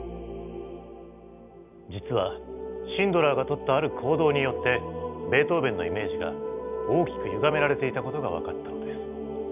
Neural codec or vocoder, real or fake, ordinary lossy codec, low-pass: none; real; none; 3.6 kHz